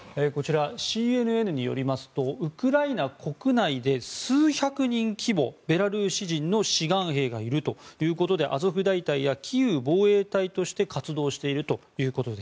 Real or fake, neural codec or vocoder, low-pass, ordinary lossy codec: real; none; none; none